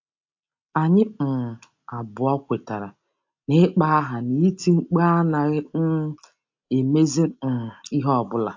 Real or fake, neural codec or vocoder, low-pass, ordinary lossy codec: real; none; 7.2 kHz; AAC, 48 kbps